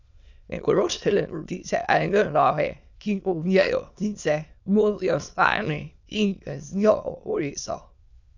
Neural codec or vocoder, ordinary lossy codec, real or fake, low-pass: autoencoder, 22.05 kHz, a latent of 192 numbers a frame, VITS, trained on many speakers; none; fake; 7.2 kHz